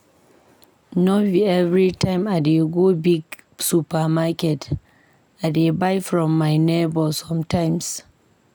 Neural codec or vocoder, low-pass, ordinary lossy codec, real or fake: none; none; none; real